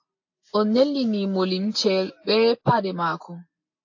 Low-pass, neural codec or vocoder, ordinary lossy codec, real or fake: 7.2 kHz; none; AAC, 48 kbps; real